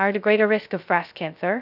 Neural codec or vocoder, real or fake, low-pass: codec, 16 kHz, 0.2 kbps, FocalCodec; fake; 5.4 kHz